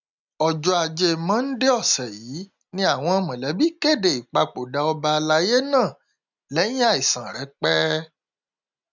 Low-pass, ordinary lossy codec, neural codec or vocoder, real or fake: 7.2 kHz; none; none; real